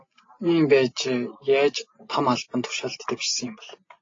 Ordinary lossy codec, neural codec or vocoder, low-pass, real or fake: AAC, 32 kbps; none; 7.2 kHz; real